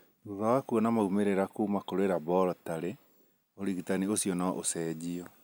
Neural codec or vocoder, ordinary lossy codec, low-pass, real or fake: none; none; none; real